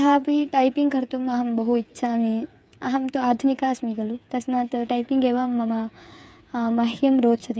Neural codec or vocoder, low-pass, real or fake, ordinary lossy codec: codec, 16 kHz, 8 kbps, FreqCodec, smaller model; none; fake; none